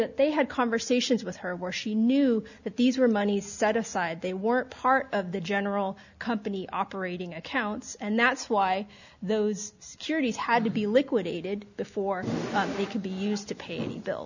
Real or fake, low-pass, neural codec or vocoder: real; 7.2 kHz; none